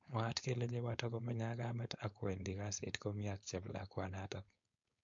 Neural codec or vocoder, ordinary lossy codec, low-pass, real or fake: codec, 16 kHz, 4.8 kbps, FACodec; MP3, 48 kbps; 7.2 kHz; fake